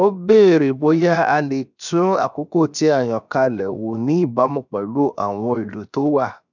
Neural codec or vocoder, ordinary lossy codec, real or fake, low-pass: codec, 16 kHz, 0.7 kbps, FocalCodec; none; fake; 7.2 kHz